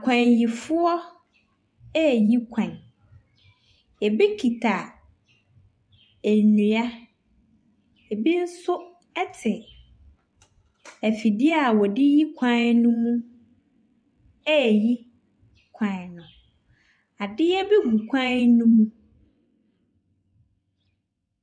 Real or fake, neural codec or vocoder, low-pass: fake; vocoder, 24 kHz, 100 mel bands, Vocos; 9.9 kHz